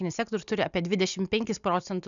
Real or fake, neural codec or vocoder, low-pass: real; none; 7.2 kHz